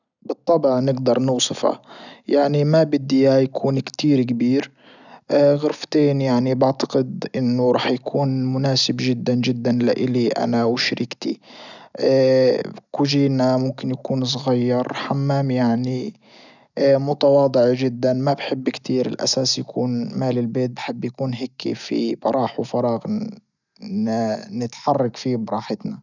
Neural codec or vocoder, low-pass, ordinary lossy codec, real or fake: none; 7.2 kHz; none; real